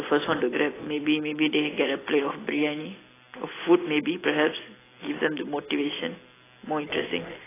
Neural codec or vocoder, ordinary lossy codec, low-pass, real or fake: none; AAC, 16 kbps; 3.6 kHz; real